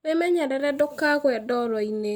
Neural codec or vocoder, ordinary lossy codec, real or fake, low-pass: none; none; real; none